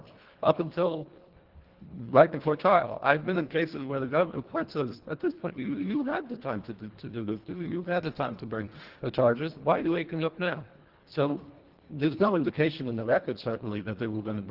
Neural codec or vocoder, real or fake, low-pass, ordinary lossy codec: codec, 24 kHz, 1.5 kbps, HILCodec; fake; 5.4 kHz; Opus, 16 kbps